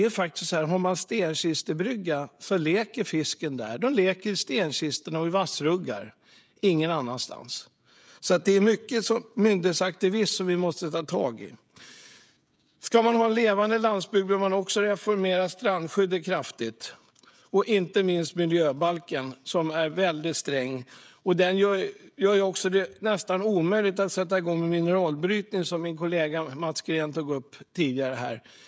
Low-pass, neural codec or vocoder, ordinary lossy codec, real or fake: none; codec, 16 kHz, 16 kbps, FreqCodec, smaller model; none; fake